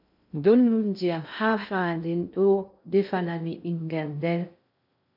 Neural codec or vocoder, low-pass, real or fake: codec, 16 kHz in and 24 kHz out, 0.6 kbps, FocalCodec, streaming, 2048 codes; 5.4 kHz; fake